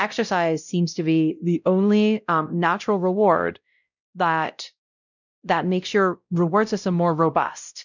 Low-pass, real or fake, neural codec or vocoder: 7.2 kHz; fake; codec, 16 kHz, 0.5 kbps, X-Codec, WavLM features, trained on Multilingual LibriSpeech